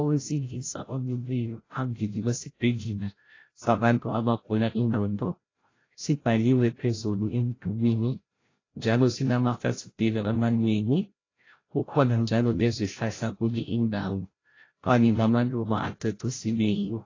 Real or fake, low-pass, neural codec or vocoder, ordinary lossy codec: fake; 7.2 kHz; codec, 16 kHz, 0.5 kbps, FreqCodec, larger model; AAC, 32 kbps